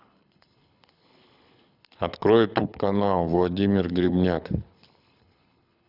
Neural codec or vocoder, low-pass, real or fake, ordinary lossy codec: codec, 24 kHz, 6 kbps, HILCodec; 5.4 kHz; fake; none